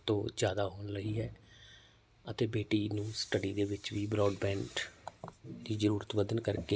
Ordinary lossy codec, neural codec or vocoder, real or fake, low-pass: none; none; real; none